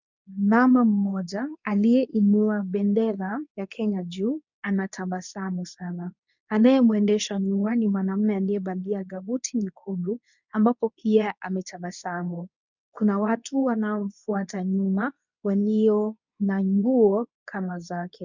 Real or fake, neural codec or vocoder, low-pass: fake; codec, 24 kHz, 0.9 kbps, WavTokenizer, medium speech release version 2; 7.2 kHz